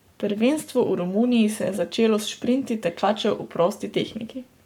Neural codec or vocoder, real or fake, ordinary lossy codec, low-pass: codec, 44.1 kHz, 7.8 kbps, Pupu-Codec; fake; none; 19.8 kHz